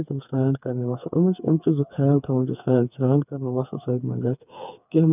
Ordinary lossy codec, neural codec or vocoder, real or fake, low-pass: none; codec, 16 kHz, 4 kbps, FreqCodec, smaller model; fake; 3.6 kHz